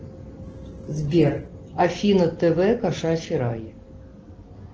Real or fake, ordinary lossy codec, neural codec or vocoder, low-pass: real; Opus, 16 kbps; none; 7.2 kHz